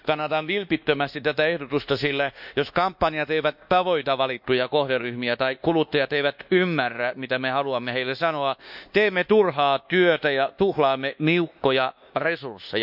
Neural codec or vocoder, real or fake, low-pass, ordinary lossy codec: codec, 24 kHz, 1.2 kbps, DualCodec; fake; 5.4 kHz; none